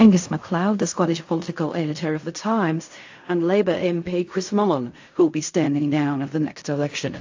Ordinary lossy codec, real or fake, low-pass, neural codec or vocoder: AAC, 48 kbps; fake; 7.2 kHz; codec, 16 kHz in and 24 kHz out, 0.4 kbps, LongCat-Audio-Codec, fine tuned four codebook decoder